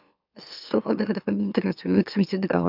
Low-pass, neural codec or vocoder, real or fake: 5.4 kHz; autoencoder, 44.1 kHz, a latent of 192 numbers a frame, MeloTTS; fake